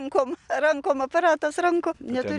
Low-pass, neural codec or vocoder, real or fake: 10.8 kHz; none; real